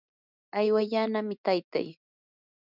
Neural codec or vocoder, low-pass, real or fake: none; 5.4 kHz; real